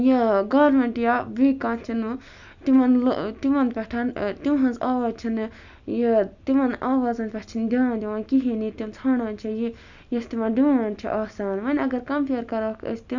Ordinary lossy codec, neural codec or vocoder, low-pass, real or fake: none; none; 7.2 kHz; real